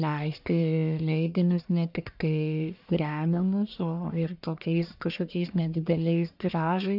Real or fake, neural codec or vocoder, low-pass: fake; codec, 44.1 kHz, 1.7 kbps, Pupu-Codec; 5.4 kHz